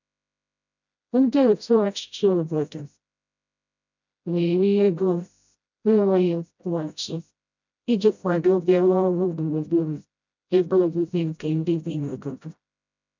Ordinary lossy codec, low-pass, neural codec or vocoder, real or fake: none; 7.2 kHz; codec, 16 kHz, 0.5 kbps, FreqCodec, smaller model; fake